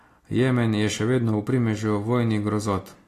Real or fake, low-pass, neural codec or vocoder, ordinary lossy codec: real; 14.4 kHz; none; AAC, 48 kbps